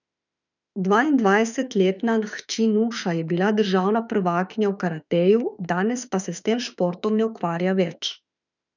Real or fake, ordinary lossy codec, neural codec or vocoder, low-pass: fake; none; autoencoder, 48 kHz, 32 numbers a frame, DAC-VAE, trained on Japanese speech; 7.2 kHz